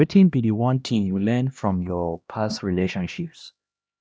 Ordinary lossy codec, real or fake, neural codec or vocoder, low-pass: none; fake; codec, 16 kHz, 1 kbps, X-Codec, HuBERT features, trained on LibriSpeech; none